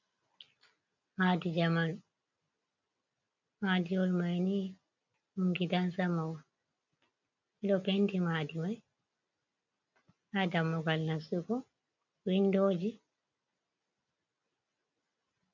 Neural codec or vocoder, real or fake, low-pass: none; real; 7.2 kHz